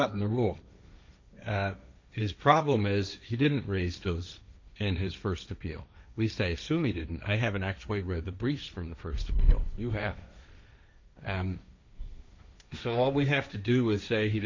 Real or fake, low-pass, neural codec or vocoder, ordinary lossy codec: fake; 7.2 kHz; codec, 16 kHz, 1.1 kbps, Voila-Tokenizer; MP3, 64 kbps